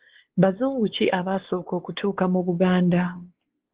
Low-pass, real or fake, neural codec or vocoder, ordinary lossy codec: 3.6 kHz; fake; codec, 16 kHz, 4 kbps, X-Codec, WavLM features, trained on Multilingual LibriSpeech; Opus, 16 kbps